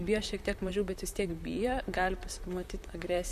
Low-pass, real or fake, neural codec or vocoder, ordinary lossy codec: 14.4 kHz; fake; vocoder, 44.1 kHz, 128 mel bands, Pupu-Vocoder; AAC, 96 kbps